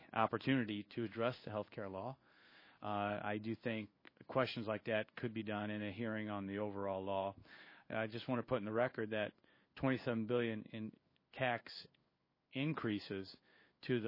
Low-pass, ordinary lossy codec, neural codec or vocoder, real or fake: 5.4 kHz; MP3, 24 kbps; codec, 16 kHz in and 24 kHz out, 1 kbps, XY-Tokenizer; fake